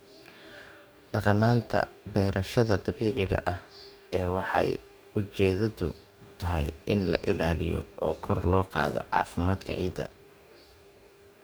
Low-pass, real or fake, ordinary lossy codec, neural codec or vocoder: none; fake; none; codec, 44.1 kHz, 2.6 kbps, DAC